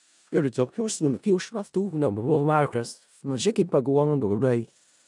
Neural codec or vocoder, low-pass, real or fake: codec, 16 kHz in and 24 kHz out, 0.4 kbps, LongCat-Audio-Codec, four codebook decoder; 10.8 kHz; fake